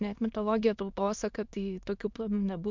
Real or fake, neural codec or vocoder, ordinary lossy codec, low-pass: fake; autoencoder, 22.05 kHz, a latent of 192 numbers a frame, VITS, trained on many speakers; MP3, 64 kbps; 7.2 kHz